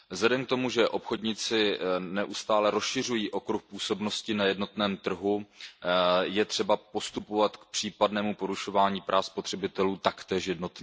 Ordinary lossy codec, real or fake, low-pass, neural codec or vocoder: none; real; none; none